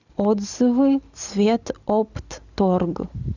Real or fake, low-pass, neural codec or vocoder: real; 7.2 kHz; none